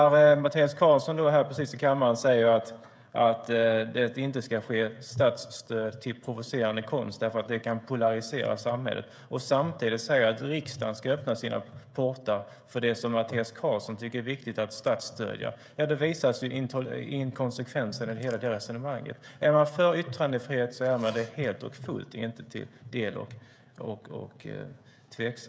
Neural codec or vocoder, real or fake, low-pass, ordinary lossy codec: codec, 16 kHz, 16 kbps, FreqCodec, smaller model; fake; none; none